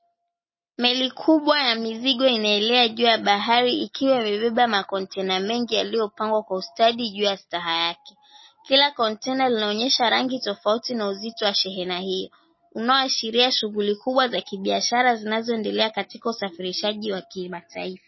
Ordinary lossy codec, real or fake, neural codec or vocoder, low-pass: MP3, 24 kbps; real; none; 7.2 kHz